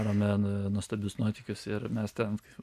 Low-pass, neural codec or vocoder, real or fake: 14.4 kHz; none; real